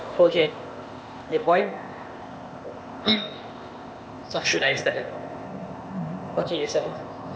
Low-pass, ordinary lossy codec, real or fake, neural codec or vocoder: none; none; fake; codec, 16 kHz, 0.8 kbps, ZipCodec